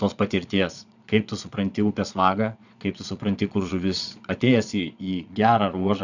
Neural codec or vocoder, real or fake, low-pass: vocoder, 22.05 kHz, 80 mel bands, Vocos; fake; 7.2 kHz